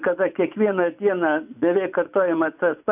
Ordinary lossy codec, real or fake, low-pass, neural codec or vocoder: AAC, 32 kbps; fake; 3.6 kHz; vocoder, 24 kHz, 100 mel bands, Vocos